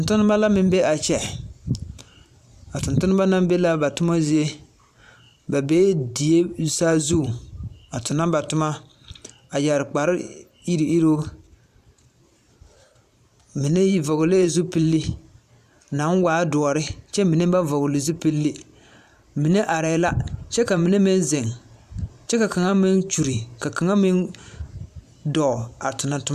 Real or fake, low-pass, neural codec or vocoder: fake; 14.4 kHz; vocoder, 44.1 kHz, 128 mel bands every 512 samples, BigVGAN v2